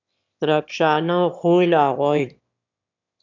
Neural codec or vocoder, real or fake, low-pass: autoencoder, 22.05 kHz, a latent of 192 numbers a frame, VITS, trained on one speaker; fake; 7.2 kHz